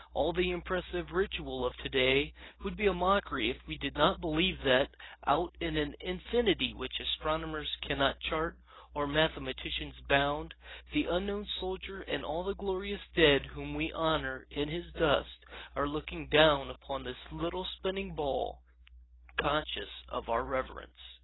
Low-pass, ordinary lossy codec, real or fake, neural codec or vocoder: 7.2 kHz; AAC, 16 kbps; real; none